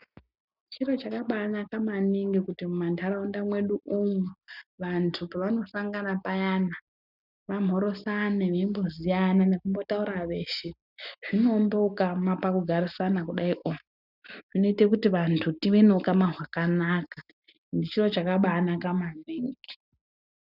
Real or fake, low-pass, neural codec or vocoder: real; 5.4 kHz; none